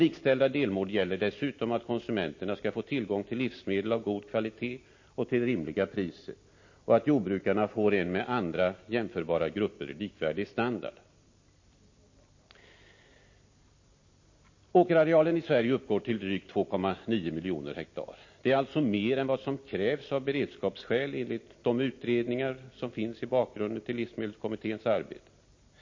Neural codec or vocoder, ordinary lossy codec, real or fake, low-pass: none; MP3, 32 kbps; real; 7.2 kHz